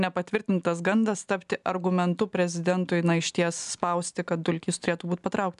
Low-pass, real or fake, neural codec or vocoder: 10.8 kHz; real; none